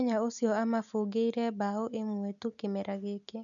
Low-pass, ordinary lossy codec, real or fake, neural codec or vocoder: 7.2 kHz; none; real; none